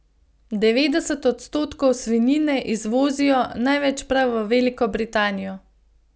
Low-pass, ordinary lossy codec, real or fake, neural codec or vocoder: none; none; real; none